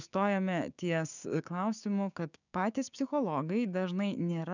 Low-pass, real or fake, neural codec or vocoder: 7.2 kHz; fake; codec, 16 kHz, 6 kbps, DAC